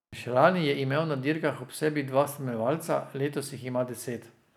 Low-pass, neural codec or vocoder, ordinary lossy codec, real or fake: 19.8 kHz; none; none; real